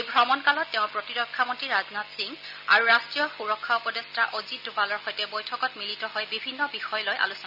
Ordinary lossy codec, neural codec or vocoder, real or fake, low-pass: none; none; real; 5.4 kHz